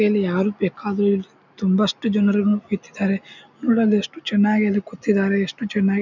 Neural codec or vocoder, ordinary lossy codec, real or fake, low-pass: none; none; real; 7.2 kHz